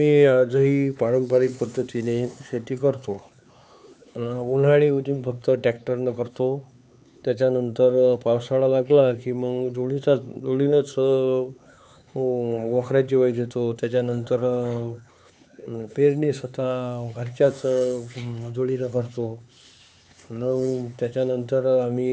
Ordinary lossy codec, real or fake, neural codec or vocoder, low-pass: none; fake; codec, 16 kHz, 4 kbps, X-Codec, HuBERT features, trained on LibriSpeech; none